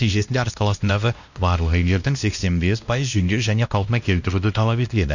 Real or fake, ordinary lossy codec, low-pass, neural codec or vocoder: fake; none; 7.2 kHz; codec, 16 kHz, 1 kbps, X-Codec, WavLM features, trained on Multilingual LibriSpeech